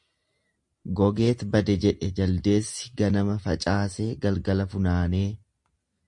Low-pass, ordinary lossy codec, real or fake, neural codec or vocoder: 10.8 kHz; MP3, 48 kbps; real; none